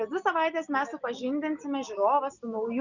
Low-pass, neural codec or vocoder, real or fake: 7.2 kHz; none; real